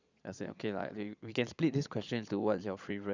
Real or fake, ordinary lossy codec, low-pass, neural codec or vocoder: fake; none; 7.2 kHz; vocoder, 22.05 kHz, 80 mel bands, Vocos